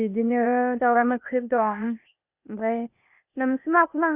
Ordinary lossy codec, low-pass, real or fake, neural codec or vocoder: Opus, 64 kbps; 3.6 kHz; fake; codec, 16 kHz, 0.8 kbps, ZipCodec